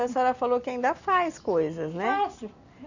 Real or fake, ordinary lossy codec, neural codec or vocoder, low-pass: real; none; none; 7.2 kHz